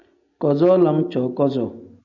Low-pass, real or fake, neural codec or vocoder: 7.2 kHz; real; none